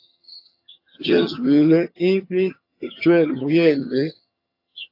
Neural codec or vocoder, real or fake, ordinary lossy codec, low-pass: vocoder, 22.05 kHz, 80 mel bands, HiFi-GAN; fake; AAC, 32 kbps; 5.4 kHz